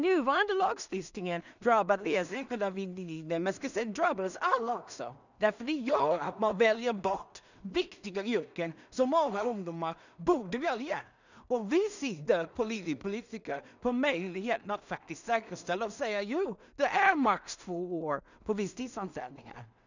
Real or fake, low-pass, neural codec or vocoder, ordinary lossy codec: fake; 7.2 kHz; codec, 16 kHz in and 24 kHz out, 0.4 kbps, LongCat-Audio-Codec, two codebook decoder; none